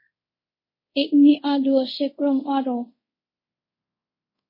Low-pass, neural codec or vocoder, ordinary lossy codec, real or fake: 5.4 kHz; codec, 24 kHz, 0.5 kbps, DualCodec; MP3, 24 kbps; fake